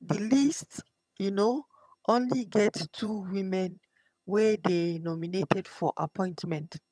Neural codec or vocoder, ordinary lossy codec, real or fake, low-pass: vocoder, 22.05 kHz, 80 mel bands, HiFi-GAN; none; fake; none